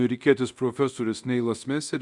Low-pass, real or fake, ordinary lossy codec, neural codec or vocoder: 10.8 kHz; fake; Opus, 64 kbps; codec, 24 kHz, 0.9 kbps, DualCodec